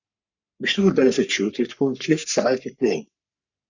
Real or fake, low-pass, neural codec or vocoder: fake; 7.2 kHz; codec, 44.1 kHz, 3.4 kbps, Pupu-Codec